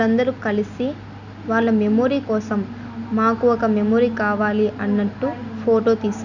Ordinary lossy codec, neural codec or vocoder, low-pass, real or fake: none; none; 7.2 kHz; real